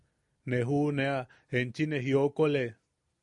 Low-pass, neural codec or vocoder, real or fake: 10.8 kHz; none; real